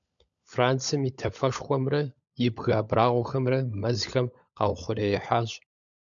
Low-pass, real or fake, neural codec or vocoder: 7.2 kHz; fake; codec, 16 kHz, 16 kbps, FunCodec, trained on LibriTTS, 50 frames a second